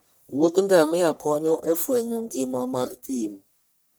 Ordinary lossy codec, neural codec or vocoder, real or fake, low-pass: none; codec, 44.1 kHz, 1.7 kbps, Pupu-Codec; fake; none